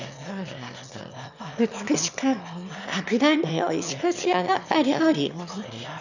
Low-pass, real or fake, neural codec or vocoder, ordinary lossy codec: 7.2 kHz; fake; autoencoder, 22.05 kHz, a latent of 192 numbers a frame, VITS, trained on one speaker; none